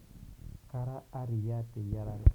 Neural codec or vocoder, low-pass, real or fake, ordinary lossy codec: none; 19.8 kHz; real; none